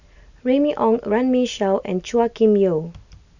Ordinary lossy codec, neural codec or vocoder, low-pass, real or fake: none; none; 7.2 kHz; real